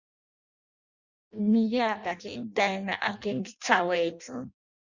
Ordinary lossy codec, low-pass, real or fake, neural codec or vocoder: Opus, 64 kbps; 7.2 kHz; fake; codec, 16 kHz in and 24 kHz out, 0.6 kbps, FireRedTTS-2 codec